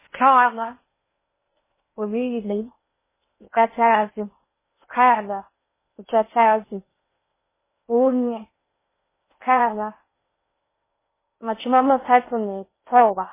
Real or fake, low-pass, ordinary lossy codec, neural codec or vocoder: fake; 3.6 kHz; MP3, 16 kbps; codec, 16 kHz in and 24 kHz out, 0.6 kbps, FocalCodec, streaming, 2048 codes